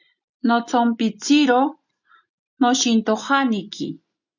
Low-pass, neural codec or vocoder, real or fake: 7.2 kHz; none; real